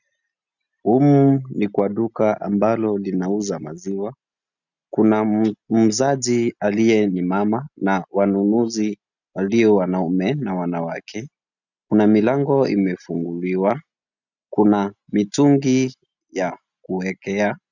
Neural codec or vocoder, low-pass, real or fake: none; 7.2 kHz; real